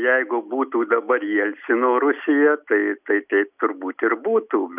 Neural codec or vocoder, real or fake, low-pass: none; real; 3.6 kHz